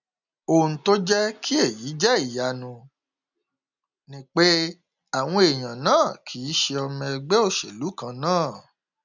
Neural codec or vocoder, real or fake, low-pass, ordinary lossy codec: none; real; 7.2 kHz; none